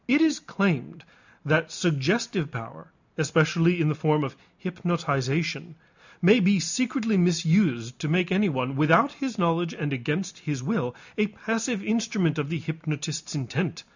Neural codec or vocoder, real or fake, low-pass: none; real; 7.2 kHz